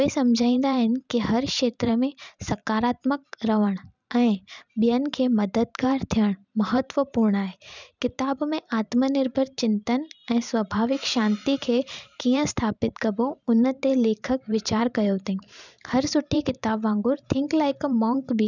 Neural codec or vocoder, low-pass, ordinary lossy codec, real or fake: vocoder, 44.1 kHz, 128 mel bands every 512 samples, BigVGAN v2; 7.2 kHz; none; fake